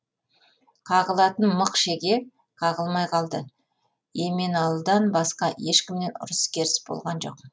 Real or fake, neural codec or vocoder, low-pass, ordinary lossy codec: real; none; none; none